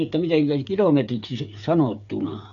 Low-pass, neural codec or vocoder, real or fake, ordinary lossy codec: 7.2 kHz; codec, 16 kHz, 8 kbps, FreqCodec, smaller model; fake; none